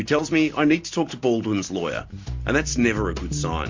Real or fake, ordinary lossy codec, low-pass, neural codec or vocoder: real; MP3, 48 kbps; 7.2 kHz; none